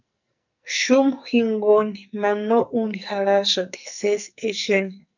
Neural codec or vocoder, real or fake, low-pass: codec, 44.1 kHz, 2.6 kbps, SNAC; fake; 7.2 kHz